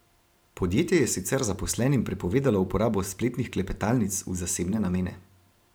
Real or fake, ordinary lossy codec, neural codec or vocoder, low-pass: fake; none; vocoder, 44.1 kHz, 128 mel bands every 512 samples, BigVGAN v2; none